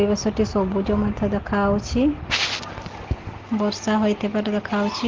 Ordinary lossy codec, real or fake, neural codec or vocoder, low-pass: Opus, 16 kbps; real; none; 7.2 kHz